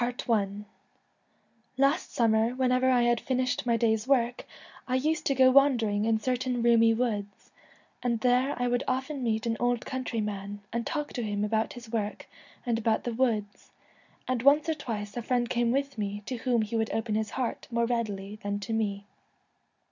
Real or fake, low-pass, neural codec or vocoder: real; 7.2 kHz; none